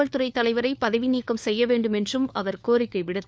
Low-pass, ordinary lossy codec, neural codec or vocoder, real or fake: none; none; codec, 16 kHz, 4 kbps, FunCodec, trained on LibriTTS, 50 frames a second; fake